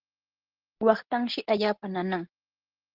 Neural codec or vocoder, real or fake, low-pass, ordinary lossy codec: none; real; 5.4 kHz; Opus, 16 kbps